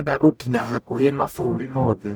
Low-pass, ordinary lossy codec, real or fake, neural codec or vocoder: none; none; fake; codec, 44.1 kHz, 0.9 kbps, DAC